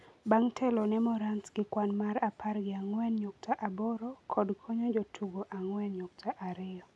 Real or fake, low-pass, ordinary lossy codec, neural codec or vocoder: real; none; none; none